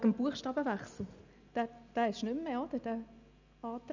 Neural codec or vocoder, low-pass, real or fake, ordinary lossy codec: none; 7.2 kHz; real; none